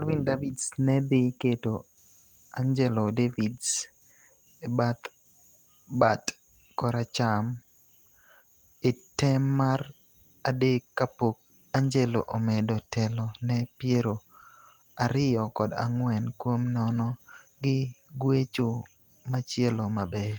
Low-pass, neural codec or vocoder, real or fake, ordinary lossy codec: 19.8 kHz; none; real; Opus, 24 kbps